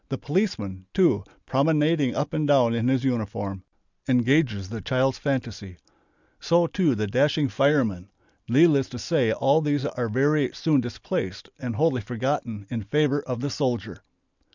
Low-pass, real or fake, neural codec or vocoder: 7.2 kHz; real; none